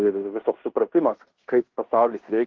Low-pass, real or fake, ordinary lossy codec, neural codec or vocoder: 7.2 kHz; fake; Opus, 16 kbps; codec, 16 kHz in and 24 kHz out, 0.9 kbps, LongCat-Audio-Codec, fine tuned four codebook decoder